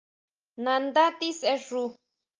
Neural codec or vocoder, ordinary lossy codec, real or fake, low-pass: none; Opus, 24 kbps; real; 7.2 kHz